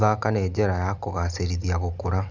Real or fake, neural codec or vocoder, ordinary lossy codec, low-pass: real; none; none; 7.2 kHz